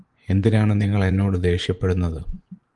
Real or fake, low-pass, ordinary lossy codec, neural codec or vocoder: fake; 10.8 kHz; Opus, 24 kbps; vocoder, 24 kHz, 100 mel bands, Vocos